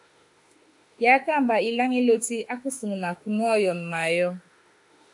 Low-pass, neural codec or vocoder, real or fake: 10.8 kHz; autoencoder, 48 kHz, 32 numbers a frame, DAC-VAE, trained on Japanese speech; fake